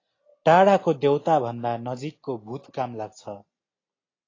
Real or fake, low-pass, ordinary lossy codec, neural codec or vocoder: real; 7.2 kHz; AAC, 32 kbps; none